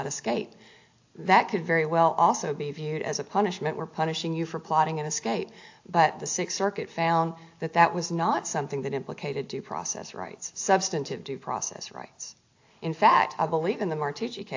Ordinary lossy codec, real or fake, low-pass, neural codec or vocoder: AAC, 48 kbps; real; 7.2 kHz; none